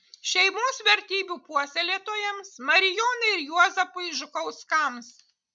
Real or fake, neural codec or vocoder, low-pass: real; none; 9.9 kHz